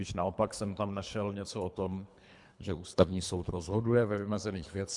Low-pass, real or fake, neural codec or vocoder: 10.8 kHz; fake; codec, 24 kHz, 3 kbps, HILCodec